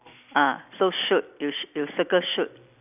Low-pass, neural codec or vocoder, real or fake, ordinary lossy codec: 3.6 kHz; none; real; none